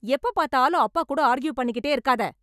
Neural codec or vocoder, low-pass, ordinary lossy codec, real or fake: none; 14.4 kHz; none; real